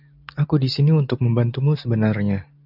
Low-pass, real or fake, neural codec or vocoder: 5.4 kHz; real; none